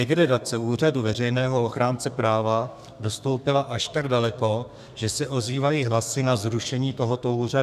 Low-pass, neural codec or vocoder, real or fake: 14.4 kHz; codec, 32 kHz, 1.9 kbps, SNAC; fake